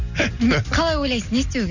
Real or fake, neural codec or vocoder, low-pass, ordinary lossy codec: real; none; 7.2 kHz; none